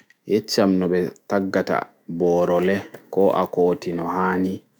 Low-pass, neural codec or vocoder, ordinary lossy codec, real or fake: none; autoencoder, 48 kHz, 128 numbers a frame, DAC-VAE, trained on Japanese speech; none; fake